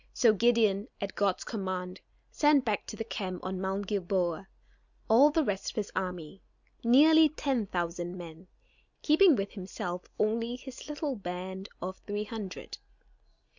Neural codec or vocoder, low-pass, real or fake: none; 7.2 kHz; real